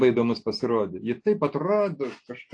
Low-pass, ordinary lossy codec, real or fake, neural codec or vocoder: 9.9 kHz; AAC, 48 kbps; real; none